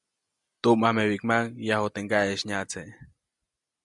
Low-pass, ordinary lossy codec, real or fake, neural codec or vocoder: 10.8 kHz; MP3, 96 kbps; real; none